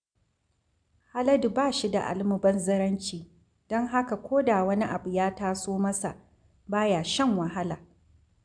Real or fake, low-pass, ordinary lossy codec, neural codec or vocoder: real; 9.9 kHz; MP3, 96 kbps; none